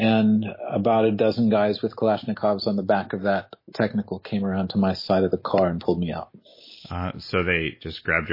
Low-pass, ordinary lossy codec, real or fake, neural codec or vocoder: 5.4 kHz; MP3, 24 kbps; real; none